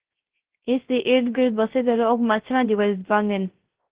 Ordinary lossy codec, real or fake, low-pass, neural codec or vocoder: Opus, 16 kbps; fake; 3.6 kHz; codec, 16 kHz, 0.3 kbps, FocalCodec